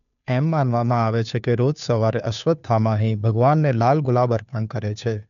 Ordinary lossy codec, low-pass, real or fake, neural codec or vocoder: none; 7.2 kHz; fake; codec, 16 kHz, 2 kbps, FunCodec, trained on Chinese and English, 25 frames a second